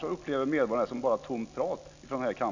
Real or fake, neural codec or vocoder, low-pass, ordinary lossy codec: real; none; 7.2 kHz; none